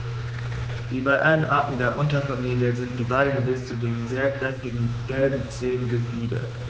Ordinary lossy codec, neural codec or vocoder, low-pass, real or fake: none; codec, 16 kHz, 2 kbps, X-Codec, HuBERT features, trained on general audio; none; fake